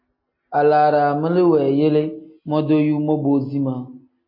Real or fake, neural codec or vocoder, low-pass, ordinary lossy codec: real; none; 5.4 kHz; MP3, 24 kbps